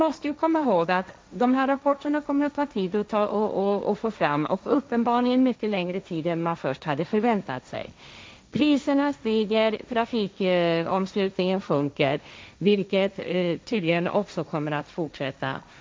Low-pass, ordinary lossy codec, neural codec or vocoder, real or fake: none; none; codec, 16 kHz, 1.1 kbps, Voila-Tokenizer; fake